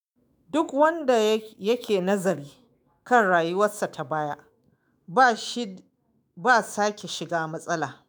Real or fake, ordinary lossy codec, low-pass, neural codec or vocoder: fake; none; none; autoencoder, 48 kHz, 128 numbers a frame, DAC-VAE, trained on Japanese speech